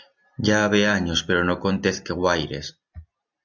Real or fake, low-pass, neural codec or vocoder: real; 7.2 kHz; none